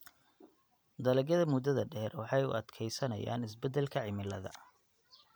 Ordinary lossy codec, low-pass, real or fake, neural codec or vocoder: none; none; fake; vocoder, 44.1 kHz, 128 mel bands every 512 samples, BigVGAN v2